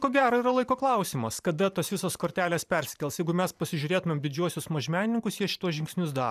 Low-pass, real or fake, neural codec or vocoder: 14.4 kHz; fake; vocoder, 44.1 kHz, 128 mel bands every 512 samples, BigVGAN v2